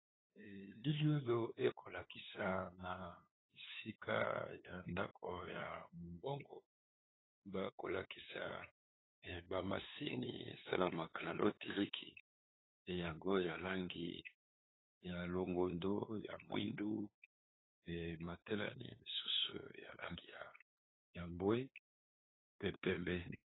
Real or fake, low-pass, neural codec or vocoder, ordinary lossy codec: fake; 7.2 kHz; codec, 16 kHz, 2 kbps, FunCodec, trained on LibriTTS, 25 frames a second; AAC, 16 kbps